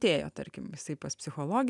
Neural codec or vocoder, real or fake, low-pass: none; real; 10.8 kHz